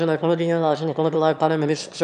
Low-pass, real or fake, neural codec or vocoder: 9.9 kHz; fake; autoencoder, 22.05 kHz, a latent of 192 numbers a frame, VITS, trained on one speaker